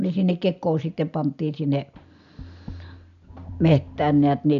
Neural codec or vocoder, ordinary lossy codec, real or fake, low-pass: none; none; real; 7.2 kHz